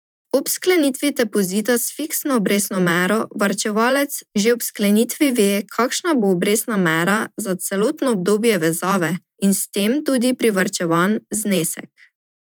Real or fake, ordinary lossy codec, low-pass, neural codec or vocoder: fake; none; none; vocoder, 44.1 kHz, 128 mel bands every 512 samples, BigVGAN v2